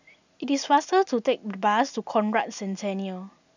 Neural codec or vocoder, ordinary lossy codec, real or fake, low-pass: none; none; real; 7.2 kHz